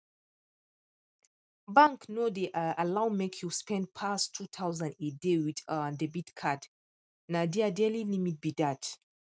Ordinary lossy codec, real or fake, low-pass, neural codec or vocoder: none; real; none; none